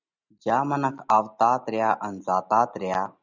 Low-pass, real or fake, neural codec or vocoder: 7.2 kHz; real; none